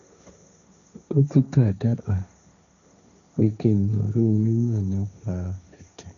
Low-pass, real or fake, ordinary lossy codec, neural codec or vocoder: 7.2 kHz; fake; none; codec, 16 kHz, 1.1 kbps, Voila-Tokenizer